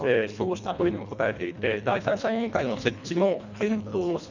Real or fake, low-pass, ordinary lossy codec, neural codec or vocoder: fake; 7.2 kHz; none; codec, 24 kHz, 1.5 kbps, HILCodec